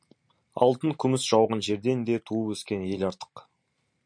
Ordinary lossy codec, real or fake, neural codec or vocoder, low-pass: MP3, 96 kbps; real; none; 9.9 kHz